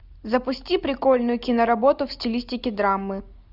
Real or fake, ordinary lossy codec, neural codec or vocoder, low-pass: real; Opus, 64 kbps; none; 5.4 kHz